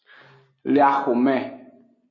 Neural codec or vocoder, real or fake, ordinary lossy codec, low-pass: none; real; MP3, 32 kbps; 7.2 kHz